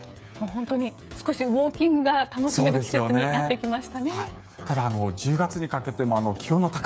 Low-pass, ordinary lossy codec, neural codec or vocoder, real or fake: none; none; codec, 16 kHz, 8 kbps, FreqCodec, smaller model; fake